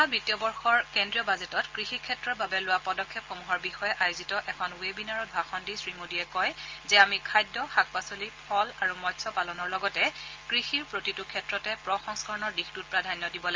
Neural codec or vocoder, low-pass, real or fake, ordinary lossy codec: none; 7.2 kHz; real; Opus, 32 kbps